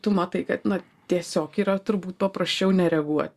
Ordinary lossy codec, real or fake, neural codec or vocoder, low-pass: Opus, 64 kbps; real; none; 14.4 kHz